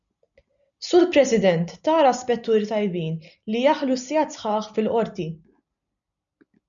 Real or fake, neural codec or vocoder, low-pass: real; none; 7.2 kHz